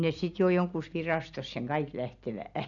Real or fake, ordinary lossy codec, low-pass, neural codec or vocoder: real; MP3, 96 kbps; 7.2 kHz; none